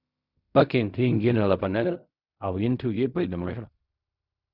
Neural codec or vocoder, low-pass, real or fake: codec, 16 kHz in and 24 kHz out, 0.4 kbps, LongCat-Audio-Codec, fine tuned four codebook decoder; 5.4 kHz; fake